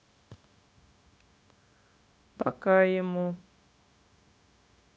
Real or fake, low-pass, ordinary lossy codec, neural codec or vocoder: fake; none; none; codec, 16 kHz, 0.9 kbps, LongCat-Audio-Codec